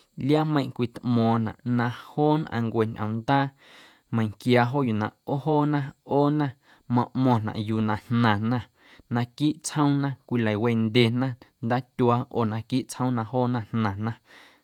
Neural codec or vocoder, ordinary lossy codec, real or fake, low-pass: vocoder, 48 kHz, 128 mel bands, Vocos; none; fake; 19.8 kHz